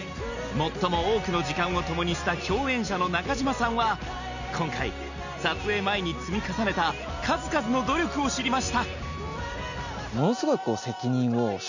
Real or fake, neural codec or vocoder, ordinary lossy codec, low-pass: real; none; none; 7.2 kHz